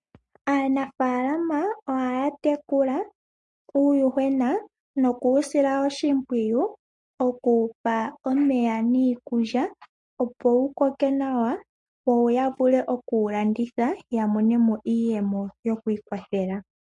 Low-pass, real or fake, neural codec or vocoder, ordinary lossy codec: 10.8 kHz; real; none; MP3, 48 kbps